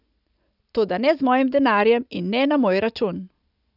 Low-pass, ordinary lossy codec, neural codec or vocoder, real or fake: 5.4 kHz; none; none; real